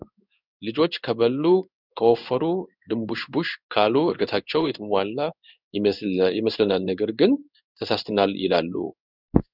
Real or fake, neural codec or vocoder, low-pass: fake; codec, 16 kHz in and 24 kHz out, 1 kbps, XY-Tokenizer; 5.4 kHz